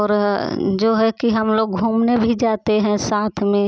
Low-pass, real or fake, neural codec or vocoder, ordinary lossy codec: none; real; none; none